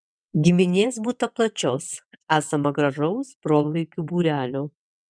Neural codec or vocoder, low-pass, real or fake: vocoder, 22.05 kHz, 80 mel bands, WaveNeXt; 9.9 kHz; fake